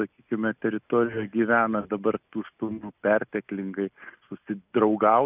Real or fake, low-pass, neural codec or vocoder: real; 3.6 kHz; none